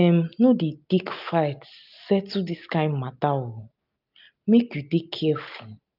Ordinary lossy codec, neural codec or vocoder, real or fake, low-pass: none; none; real; 5.4 kHz